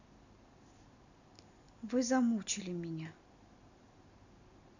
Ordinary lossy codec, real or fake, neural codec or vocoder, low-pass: none; real; none; 7.2 kHz